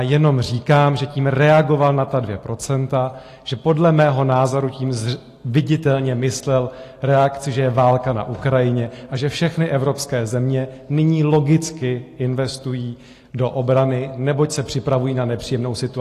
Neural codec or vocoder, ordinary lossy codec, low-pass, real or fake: none; AAC, 48 kbps; 14.4 kHz; real